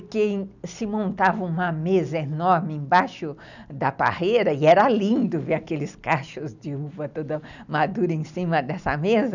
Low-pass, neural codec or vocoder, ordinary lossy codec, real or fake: 7.2 kHz; none; none; real